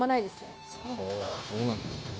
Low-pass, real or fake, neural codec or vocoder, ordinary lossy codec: none; fake; codec, 16 kHz, 0.9 kbps, LongCat-Audio-Codec; none